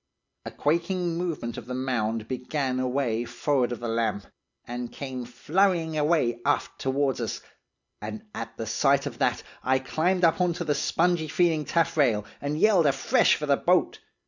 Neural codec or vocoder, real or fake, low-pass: none; real; 7.2 kHz